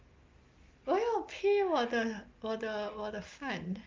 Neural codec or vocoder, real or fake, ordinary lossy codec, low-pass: none; real; Opus, 24 kbps; 7.2 kHz